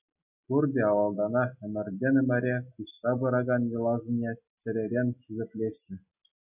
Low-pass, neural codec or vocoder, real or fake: 3.6 kHz; none; real